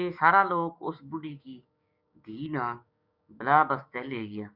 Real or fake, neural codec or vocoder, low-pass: fake; codec, 16 kHz, 6 kbps, DAC; 5.4 kHz